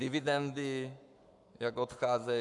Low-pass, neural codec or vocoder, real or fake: 10.8 kHz; codec, 44.1 kHz, 7.8 kbps, Pupu-Codec; fake